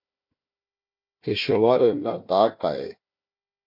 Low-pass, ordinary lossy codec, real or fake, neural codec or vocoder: 5.4 kHz; MP3, 32 kbps; fake; codec, 16 kHz, 1 kbps, FunCodec, trained on Chinese and English, 50 frames a second